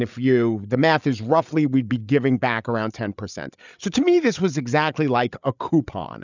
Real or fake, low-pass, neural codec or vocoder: fake; 7.2 kHz; codec, 16 kHz, 8 kbps, FreqCodec, larger model